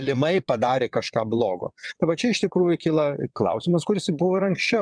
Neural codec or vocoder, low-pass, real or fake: vocoder, 22.05 kHz, 80 mel bands, WaveNeXt; 9.9 kHz; fake